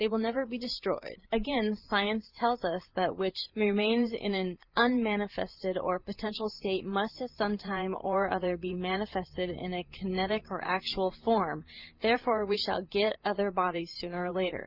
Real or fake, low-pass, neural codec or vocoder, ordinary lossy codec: fake; 5.4 kHz; vocoder, 44.1 kHz, 128 mel bands every 512 samples, BigVGAN v2; Opus, 24 kbps